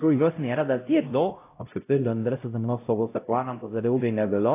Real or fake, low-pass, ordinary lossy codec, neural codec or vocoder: fake; 3.6 kHz; AAC, 24 kbps; codec, 16 kHz, 0.5 kbps, X-Codec, HuBERT features, trained on LibriSpeech